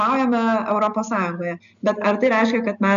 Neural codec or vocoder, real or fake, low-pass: none; real; 7.2 kHz